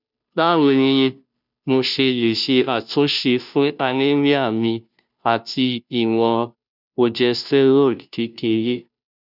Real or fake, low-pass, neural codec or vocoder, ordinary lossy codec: fake; 5.4 kHz; codec, 16 kHz, 0.5 kbps, FunCodec, trained on Chinese and English, 25 frames a second; none